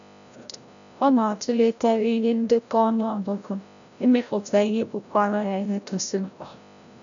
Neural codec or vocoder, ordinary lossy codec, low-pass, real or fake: codec, 16 kHz, 0.5 kbps, FreqCodec, larger model; none; 7.2 kHz; fake